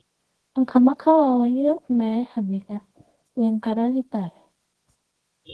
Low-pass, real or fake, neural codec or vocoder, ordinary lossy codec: 10.8 kHz; fake; codec, 24 kHz, 0.9 kbps, WavTokenizer, medium music audio release; Opus, 16 kbps